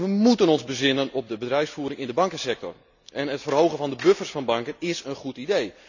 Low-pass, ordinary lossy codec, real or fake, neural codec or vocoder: 7.2 kHz; none; real; none